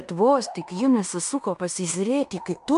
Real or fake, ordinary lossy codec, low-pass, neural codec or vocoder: fake; AAC, 96 kbps; 10.8 kHz; codec, 16 kHz in and 24 kHz out, 0.9 kbps, LongCat-Audio-Codec, fine tuned four codebook decoder